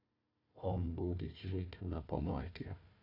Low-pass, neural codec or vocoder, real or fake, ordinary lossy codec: 5.4 kHz; codec, 16 kHz, 1 kbps, FunCodec, trained on Chinese and English, 50 frames a second; fake; AAC, 32 kbps